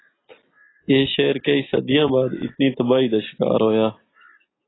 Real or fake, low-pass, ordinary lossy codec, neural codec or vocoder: real; 7.2 kHz; AAC, 16 kbps; none